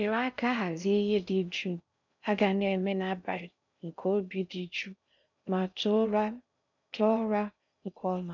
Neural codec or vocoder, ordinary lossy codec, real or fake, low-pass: codec, 16 kHz in and 24 kHz out, 0.6 kbps, FocalCodec, streaming, 4096 codes; none; fake; 7.2 kHz